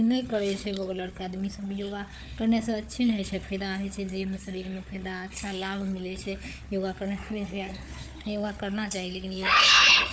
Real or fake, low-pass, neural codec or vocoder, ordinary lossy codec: fake; none; codec, 16 kHz, 4 kbps, FunCodec, trained on Chinese and English, 50 frames a second; none